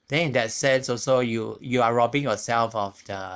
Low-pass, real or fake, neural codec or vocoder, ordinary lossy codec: none; fake; codec, 16 kHz, 4.8 kbps, FACodec; none